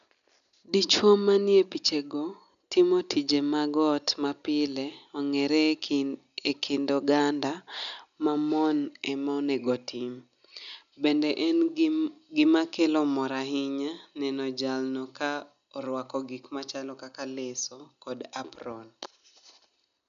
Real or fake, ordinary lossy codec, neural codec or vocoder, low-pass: real; none; none; 7.2 kHz